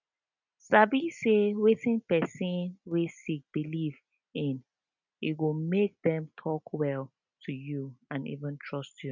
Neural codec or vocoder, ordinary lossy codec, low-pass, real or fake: none; none; 7.2 kHz; real